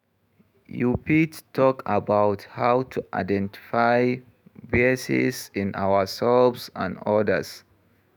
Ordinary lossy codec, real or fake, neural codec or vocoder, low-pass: none; fake; autoencoder, 48 kHz, 128 numbers a frame, DAC-VAE, trained on Japanese speech; none